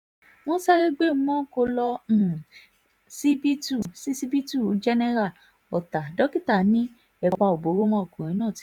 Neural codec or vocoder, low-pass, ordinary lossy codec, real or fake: vocoder, 44.1 kHz, 128 mel bands every 512 samples, BigVGAN v2; 19.8 kHz; none; fake